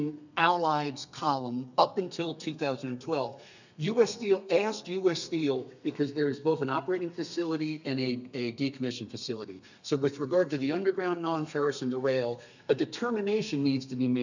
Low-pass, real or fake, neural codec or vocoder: 7.2 kHz; fake; codec, 32 kHz, 1.9 kbps, SNAC